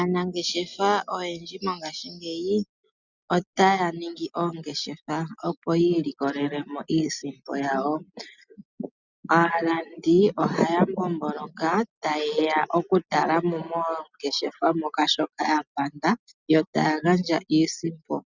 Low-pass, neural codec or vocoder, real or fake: 7.2 kHz; none; real